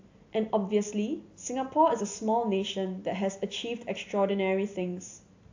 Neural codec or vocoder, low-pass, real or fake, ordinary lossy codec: none; 7.2 kHz; real; AAC, 48 kbps